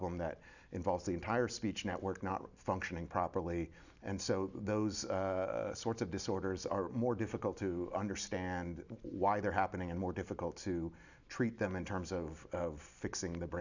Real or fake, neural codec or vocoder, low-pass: real; none; 7.2 kHz